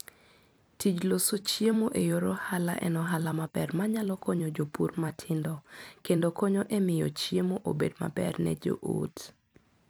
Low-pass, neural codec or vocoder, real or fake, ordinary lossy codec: none; vocoder, 44.1 kHz, 128 mel bands every 256 samples, BigVGAN v2; fake; none